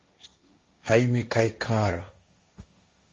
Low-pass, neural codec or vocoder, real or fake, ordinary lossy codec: 7.2 kHz; codec, 16 kHz, 1.1 kbps, Voila-Tokenizer; fake; Opus, 32 kbps